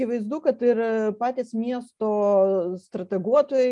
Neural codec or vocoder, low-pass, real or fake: none; 10.8 kHz; real